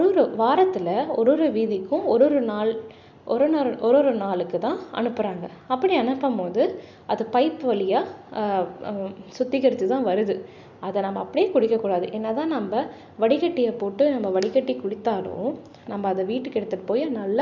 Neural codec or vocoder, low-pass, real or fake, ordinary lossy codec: none; 7.2 kHz; real; none